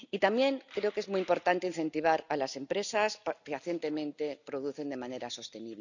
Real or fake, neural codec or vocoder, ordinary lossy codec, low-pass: real; none; none; 7.2 kHz